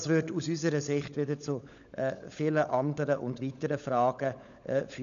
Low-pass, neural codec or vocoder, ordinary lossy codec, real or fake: 7.2 kHz; codec, 16 kHz, 16 kbps, FunCodec, trained on LibriTTS, 50 frames a second; MP3, 96 kbps; fake